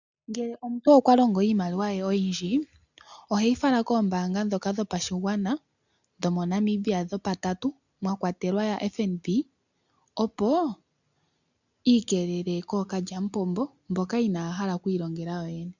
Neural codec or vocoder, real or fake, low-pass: none; real; 7.2 kHz